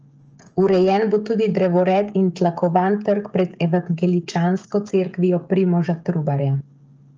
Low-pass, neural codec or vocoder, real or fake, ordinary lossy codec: 7.2 kHz; codec, 16 kHz, 16 kbps, FreqCodec, smaller model; fake; Opus, 24 kbps